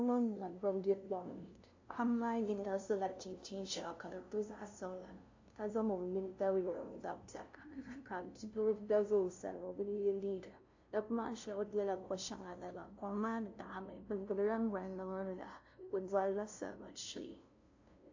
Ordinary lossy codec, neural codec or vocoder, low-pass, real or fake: AAC, 48 kbps; codec, 16 kHz, 0.5 kbps, FunCodec, trained on LibriTTS, 25 frames a second; 7.2 kHz; fake